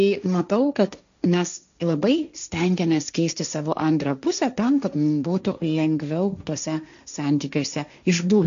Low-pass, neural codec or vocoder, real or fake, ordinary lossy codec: 7.2 kHz; codec, 16 kHz, 1.1 kbps, Voila-Tokenizer; fake; AAC, 96 kbps